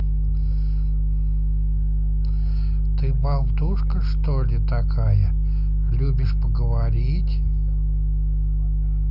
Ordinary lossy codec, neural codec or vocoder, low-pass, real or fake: none; none; 5.4 kHz; real